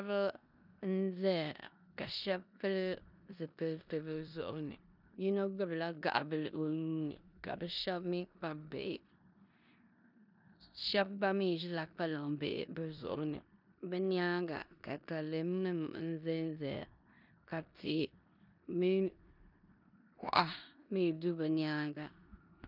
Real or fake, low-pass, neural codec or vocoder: fake; 5.4 kHz; codec, 16 kHz in and 24 kHz out, 0.9 kbps, LongCat-Audio-Codec, four codebook decoder